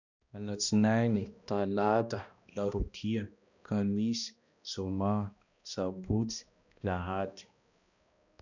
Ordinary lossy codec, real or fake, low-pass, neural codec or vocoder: none; fake; 7.2 kHz; codec, 16 kHz, 1 kbps, X-Codec, HuBERT features, trained on balanced general audio